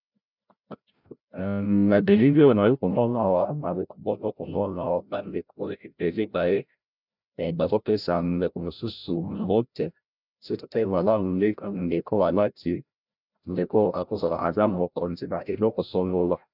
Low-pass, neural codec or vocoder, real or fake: 5.4 kHz; codec, 16 kHz, 0.5 kbps, FreqCodec, larger model; fake